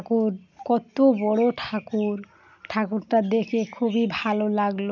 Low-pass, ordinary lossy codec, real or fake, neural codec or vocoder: 7.2 kHz; none; real; none